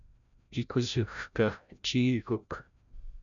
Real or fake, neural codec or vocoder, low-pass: fake; codec, 16 kHz, 0.5 kbps, FreqCodec, larger model; 7.2 kHz